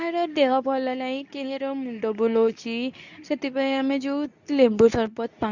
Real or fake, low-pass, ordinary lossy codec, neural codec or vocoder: fake; 7.2 kHz; none; codec, 24 kHz, 0.9 kbps, WavTokenizer, medium speech release version 2